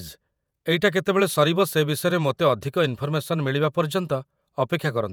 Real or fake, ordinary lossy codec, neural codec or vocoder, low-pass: fake; none; vocoder, 48 kHz, 128 mel bands, Vocos; none